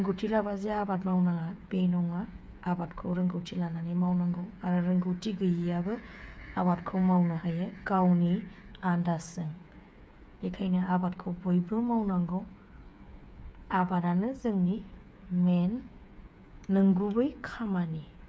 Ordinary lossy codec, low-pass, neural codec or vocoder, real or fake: none; none; codec, 16 kHz, 8 kbps, FreqCodec, smaller model; fake